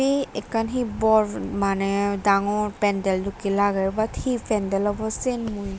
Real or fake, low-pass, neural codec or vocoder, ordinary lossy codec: real; none; none; none